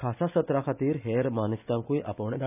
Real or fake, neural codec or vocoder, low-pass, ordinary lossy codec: real; none; 3.6 kHz; none